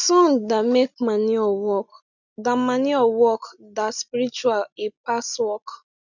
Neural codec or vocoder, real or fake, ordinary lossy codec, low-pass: none; real; none; 7.2 kHz